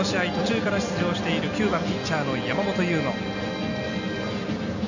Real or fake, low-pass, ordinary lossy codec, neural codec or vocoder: real; 7.2 kHz; none; none